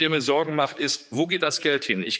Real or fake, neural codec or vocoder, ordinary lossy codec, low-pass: fake; codec, 16 kHz, 4 kbps, X-Codec, HuBERT features, trained on general audio; none; none